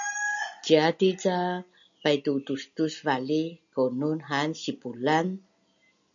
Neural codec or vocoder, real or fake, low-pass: none; real; 7.2 kHz